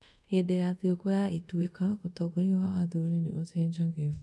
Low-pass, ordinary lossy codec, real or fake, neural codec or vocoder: none; none; fake; codec, 24 kHz, 0.5 kbps, DualCodec